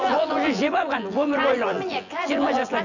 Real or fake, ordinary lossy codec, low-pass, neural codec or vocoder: fake; none; 7.2 kHz; vocoder, 24 kHz, 100 mel bands, Vocos